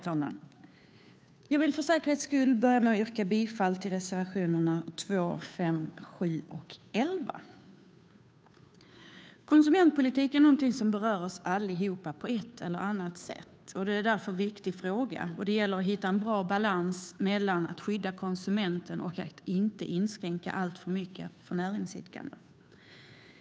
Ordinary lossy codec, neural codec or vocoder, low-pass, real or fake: none; codec, 16 kHz, 2 kbps, FunCodec, trained on Chinese and English, 25 frames a second; none; fake